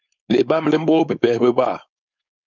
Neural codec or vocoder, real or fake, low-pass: codec, 16 kHz, 4.8 kbps, FACodec; fake; 7.2 kHz